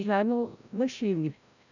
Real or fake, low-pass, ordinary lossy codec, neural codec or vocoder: fake; 7.2 kHz; none; codec, 16 kHz, 0.5 kbps, FreqCodec, larger model